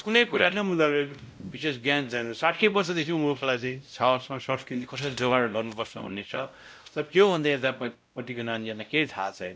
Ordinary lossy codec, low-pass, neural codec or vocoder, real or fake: none; none; codec, 16 kHz, 0.5 kbps, X-Codec, WavLM features, trained on Multilingual LibriSpeech; fake